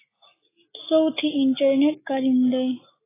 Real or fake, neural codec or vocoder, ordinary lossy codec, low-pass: real; none; AAC, 16 kbps; 3.6 kHz